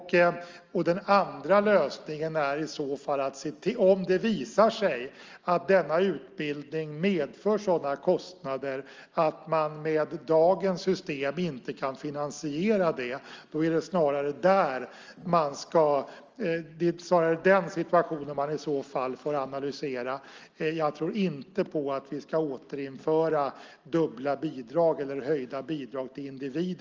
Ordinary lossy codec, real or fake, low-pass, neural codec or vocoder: Opus, 32 kbps; real; 7.2 kHz; none